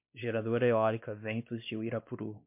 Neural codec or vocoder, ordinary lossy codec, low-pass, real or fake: codec, 16 kHz, 2 kbps, X-Codec, WavLM features, trained on Multilingual LibriSpeech; MP3, 32 kbps; 3.6 kHz; fake